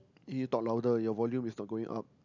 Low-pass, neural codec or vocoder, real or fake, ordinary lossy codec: 7.2 kHz; none; real; none